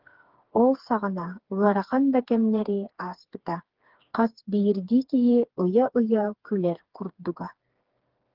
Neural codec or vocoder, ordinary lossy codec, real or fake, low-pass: codec, 16 kHz, 4 kbps, FreqCodec, smaller model; Opus, 16 kbps; fake; 5.4 kHz